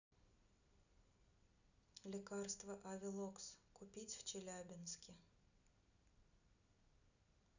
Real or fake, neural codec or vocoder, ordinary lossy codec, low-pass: real; none; none; 7.2 kHz